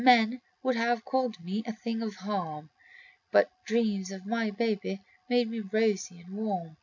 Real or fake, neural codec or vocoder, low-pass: real; none; 7.2 kHz